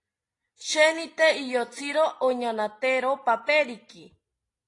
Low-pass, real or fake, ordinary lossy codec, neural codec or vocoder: 10.8 kHz; real; AAC, 32 kbps; none